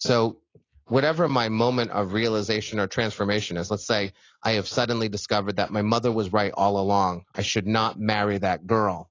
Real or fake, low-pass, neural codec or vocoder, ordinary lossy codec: real; 7.2 kHz; none; AAC, 32 kbps